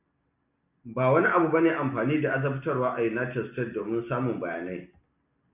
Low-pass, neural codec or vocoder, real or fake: 3.6 kHz; none; real